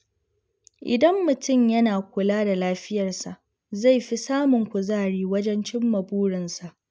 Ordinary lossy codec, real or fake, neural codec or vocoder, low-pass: none; real; none; none